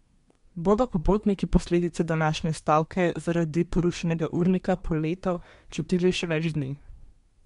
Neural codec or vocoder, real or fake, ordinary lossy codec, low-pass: codec, 24 kHz, 1 kbps, SNAC; fake; MP3, 64 kbps; 10.8 kHz